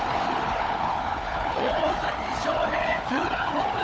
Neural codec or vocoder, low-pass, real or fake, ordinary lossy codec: codec, 16 kHz, 4 kbps, FunCodec, trained on Chinese and English, 50 frames a second; none; fake; none